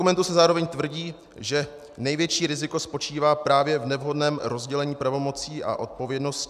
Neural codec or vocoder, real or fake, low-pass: none; real; 14.4 kHz